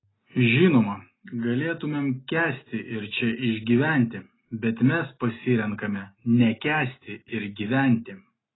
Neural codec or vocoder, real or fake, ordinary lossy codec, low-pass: none; real; AAC, 16 kbps; 7.2 kHz